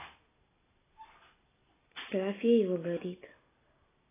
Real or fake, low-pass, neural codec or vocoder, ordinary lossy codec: real; 3.6 kHz; none; AAC, 16 kbps